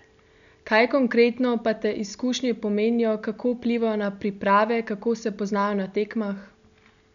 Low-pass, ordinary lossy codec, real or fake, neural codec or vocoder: 7.2 kHz; none; real; none